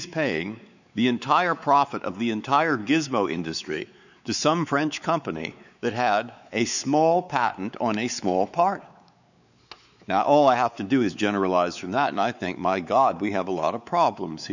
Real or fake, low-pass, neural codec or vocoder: fake; 7.2 kHz; codec, 16 kHz, 4 kbps, X-Codec, WavLM features, trained on Multilingual LibriSpeech